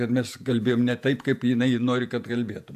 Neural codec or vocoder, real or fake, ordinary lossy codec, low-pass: vocoder, 48 kHz, 128 mel bands, Vocos; fake; MP3, 96 kbps; 14.4 kHz